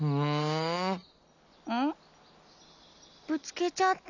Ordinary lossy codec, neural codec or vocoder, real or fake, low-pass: none; none; real; 7.2 kHz